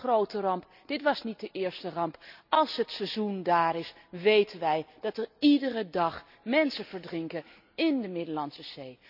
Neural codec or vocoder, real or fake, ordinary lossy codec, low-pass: none; real; none; 5.4 kHz